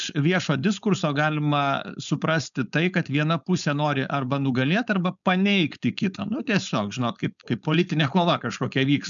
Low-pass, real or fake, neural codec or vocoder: 7.2 kHz; fake; codec, 16 kHz, 4.8 kbps, FACodec